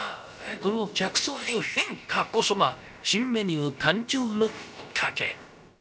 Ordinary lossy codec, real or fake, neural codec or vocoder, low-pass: none; fake; codec, 16 kHz, about 1 kbps, DyCAST, with the encoder's durations; none